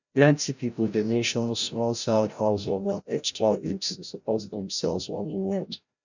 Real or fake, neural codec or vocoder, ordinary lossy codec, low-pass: fake; codec, 16 kHz, 0.5 kbps, FreqCodec, larger model; none; 7.2 kHz